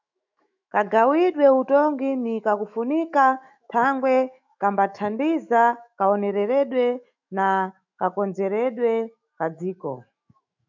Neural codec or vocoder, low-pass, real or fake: autoencoder, 48 kHz, 128 numbers a frame, DAC-VAE, trained on Japanese speech; 7.2 kHz; fake